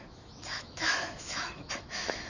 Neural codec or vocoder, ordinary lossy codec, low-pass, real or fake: autoencoder, 48 kHz, 128 numbers a frame, DAC-VAE, trained on Japanese speech; none; 7.2 kHz; fake